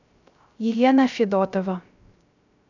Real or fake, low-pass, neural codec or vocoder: fake; 7.2 kHz; codec, 16 kHz, 0.3 kbps, FocalCodec